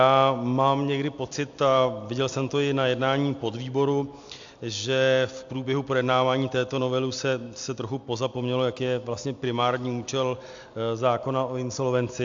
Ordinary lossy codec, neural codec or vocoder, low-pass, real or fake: AAC, 64 kbps; none; 7.2 kHz; real